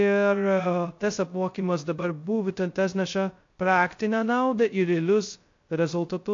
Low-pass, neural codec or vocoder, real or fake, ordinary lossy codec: 7.2 kHz; codec, 16 kHz, 0.2 kbps, FocalCodec; fake; AAC, 48 kbps